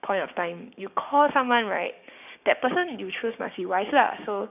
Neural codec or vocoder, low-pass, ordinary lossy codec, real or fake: codec, 16 kHz, 6 kbps, DAC; 3.6 kHz; none; fake